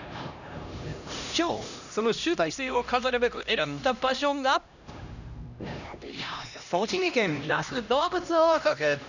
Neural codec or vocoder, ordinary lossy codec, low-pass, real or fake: codec, 16 kHz, 1 kbps, X-Codec, HuBERT features, trained on LibriSpeech; none; 7.2 kHz; fake